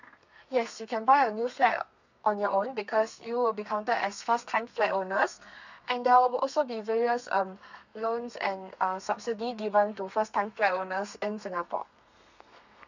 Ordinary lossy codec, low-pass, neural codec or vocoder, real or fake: none; 7.2 kHz; codec, 32 kHz, 1.9 kbps, SNAC; fake